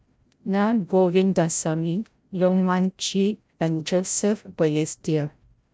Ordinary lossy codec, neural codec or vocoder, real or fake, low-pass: none; codec, 16 kHz, 0.5 kbps, FreqCodec, larger model; fake; none